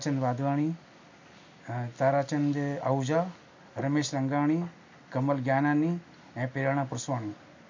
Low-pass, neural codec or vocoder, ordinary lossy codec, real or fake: 7.2 kHz; none; MP3, 64 kbps; real